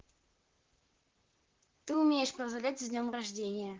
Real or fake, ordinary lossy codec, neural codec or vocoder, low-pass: fake; Opus, 16 kbps; vocoder, 44.1 kHz, 128 mel bands, Pupu-Vocoder; 7.2 kHz